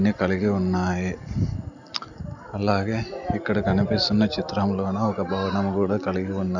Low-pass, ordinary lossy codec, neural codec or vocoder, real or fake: 7.2 kHz; none; none; real